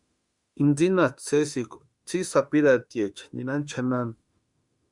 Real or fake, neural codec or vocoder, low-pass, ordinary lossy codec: fake; autoencoder, 48 kHz, 32 numbers a frame, DAC-VAE, trained on Japanese speech; 10.8 kHz; Opus, 64 kbps